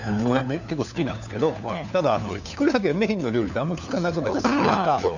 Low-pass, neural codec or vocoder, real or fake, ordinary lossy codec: 7.2 kHz; codec, 16 kHz, 4 kbps, FunCodec, trained on LibriTTS, 50 frames a second; fake; none